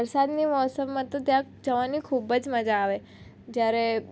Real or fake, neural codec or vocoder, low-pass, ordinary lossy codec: real; none; none; none